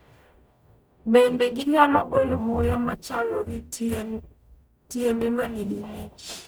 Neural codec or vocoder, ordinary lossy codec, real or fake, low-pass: codec, 44.1 kHz, 0.9 kbps, DAC; none; fake; none